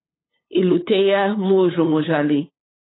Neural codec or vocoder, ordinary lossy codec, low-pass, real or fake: codec, 16 kHz, 8 kbps, FunCodec, trained on LibriTTS, 25 frames a second; AAC, 16 kbps; 7.2 kHz; fake